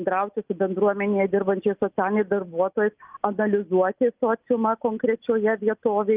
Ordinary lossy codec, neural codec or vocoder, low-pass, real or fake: Opus, 32 kbps; none; 3.6 kHz; real